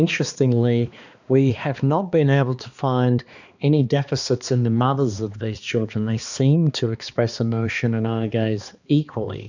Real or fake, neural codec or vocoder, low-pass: fake; codec, 16 kHz, 2 kbps, X-Codec, HuBERT features, trained on balanced general audio; 7.2 kHz